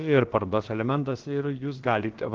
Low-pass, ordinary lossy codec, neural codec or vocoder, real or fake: 7.2 kHz; Opus, 16 kbps; codec, 16 kHz, about 1 kbps, DyCAST, with the encoder's durations; fake